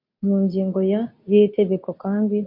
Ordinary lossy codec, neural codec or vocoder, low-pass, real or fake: MP3, 32 kbps; codec, 24 kHz, 0.9 kbps, WavTokenizer, medium speech release version 2; 5.4 kHz; fake